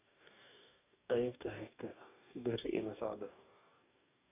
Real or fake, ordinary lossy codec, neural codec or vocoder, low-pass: fake; AAC, 32 kbps; codec, 44.1 kHz, 2.6 kbps, DAC; 3.6 kHz